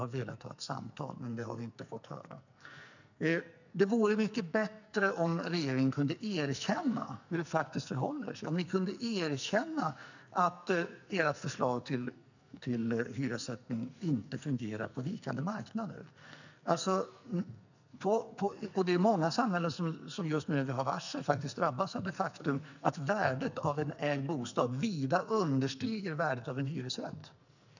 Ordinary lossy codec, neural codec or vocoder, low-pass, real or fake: none; codec, 44.1 kHz, 2.6 kbps, SNAC; 7.2 kHz; fake